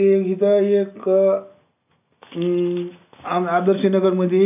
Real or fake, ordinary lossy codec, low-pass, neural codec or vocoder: real; none; 3.6 kHz; none